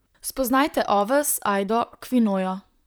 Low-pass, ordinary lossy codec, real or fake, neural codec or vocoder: none; none; fake; vocoder, 44.1 kHz, 128 mel bands, Pupu-Vocoder